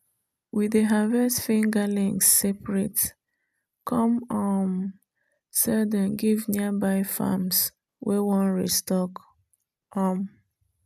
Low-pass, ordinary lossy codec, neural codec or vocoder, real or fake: 14.4 kHz; none; none; real